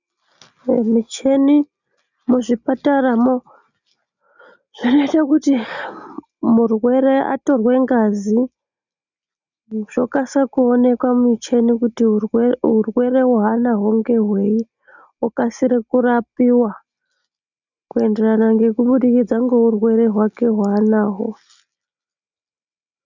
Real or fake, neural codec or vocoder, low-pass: real; none; 7.2 kHz